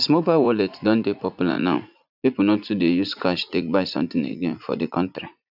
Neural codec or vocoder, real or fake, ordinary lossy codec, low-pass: vocoder, 44.1 kHz, 80 mel bands, Vocos; fake; none; 5.4 kHz